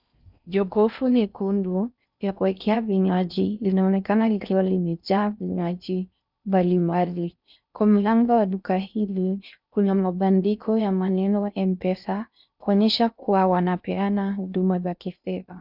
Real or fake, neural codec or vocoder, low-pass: fake; codec, 16 kHz in and 24 kHz out, 0.6 kbps, FocalCodec, streaming, 4096 codes; 5.4 kHz